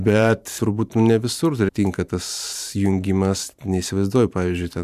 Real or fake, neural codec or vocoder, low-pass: fake; vocoder, 44.1 kHz, 128 mel bands every 512 samples, BigVGAN v2; 14.4 kHz